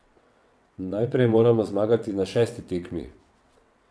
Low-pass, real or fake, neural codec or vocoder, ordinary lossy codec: none; fake; vocoder, 22.05 kHz, 80 mel bands, WaveNeXt; none